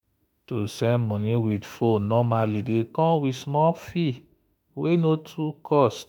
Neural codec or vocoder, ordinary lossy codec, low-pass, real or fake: autoencoder, 48 kHz, 32 numbers a frame, DAC-VAE, trained on Japanese speech; none; none; fake